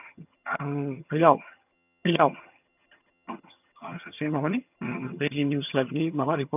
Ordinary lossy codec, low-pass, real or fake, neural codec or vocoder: none; 3.6 kHz; fake; vocoder, 22.05 kHz, 80 mel bands, HiFi-GAN